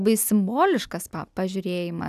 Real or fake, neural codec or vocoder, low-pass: real; none; 14.4 kHz